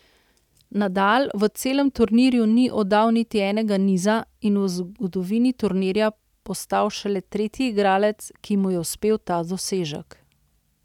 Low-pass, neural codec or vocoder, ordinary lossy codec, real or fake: 19.8 kHz; none; none; real